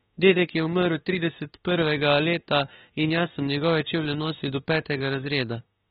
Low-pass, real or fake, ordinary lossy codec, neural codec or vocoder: 19.8 kHz; fake; AAC, 16 kbps; autoencoder, 48 kHz, 32 numbers a frame, DAC-VAE, trained on Japanese speech